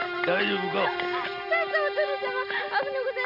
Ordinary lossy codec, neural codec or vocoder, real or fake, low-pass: none; none; real; 5.4 kHz